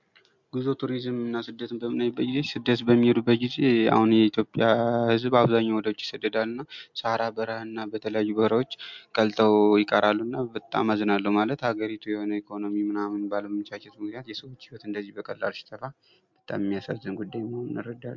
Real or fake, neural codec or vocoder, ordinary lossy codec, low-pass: real; none; MP3, 64 kbps; 7.2 kHz